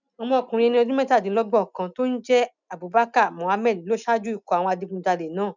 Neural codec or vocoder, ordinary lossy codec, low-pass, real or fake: none; none; 7.2 kHz; real